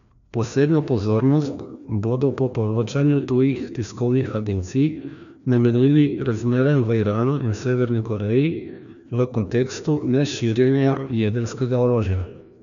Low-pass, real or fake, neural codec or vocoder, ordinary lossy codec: 7.2 kHz; fake; codec, 16 kHz, 1 kbps, FreqCodec, larger model; none